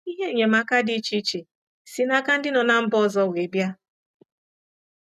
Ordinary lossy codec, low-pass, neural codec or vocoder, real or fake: none; 14.4 kHz; none; real